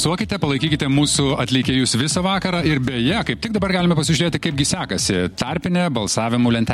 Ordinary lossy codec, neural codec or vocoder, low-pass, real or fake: MP3, 64 kbps; none; 19.8 kHz; real